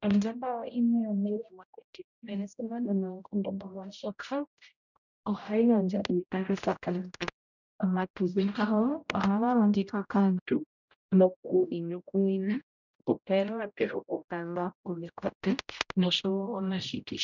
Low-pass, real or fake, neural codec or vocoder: 7.2 kHz; fake; codec, 16 kHz, 0.5 kbps, X-Codec, HuBERT features, trained on general audio